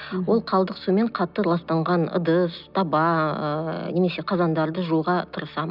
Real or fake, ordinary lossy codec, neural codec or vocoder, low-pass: real; none; none; 5.4 kHz